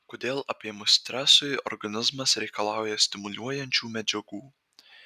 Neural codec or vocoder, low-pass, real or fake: none; 14.4 kHz; real